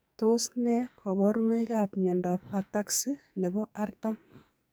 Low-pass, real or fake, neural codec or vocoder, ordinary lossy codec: none; fake; codec, 44.1 kHz, 2.6 kbps, SNAC; none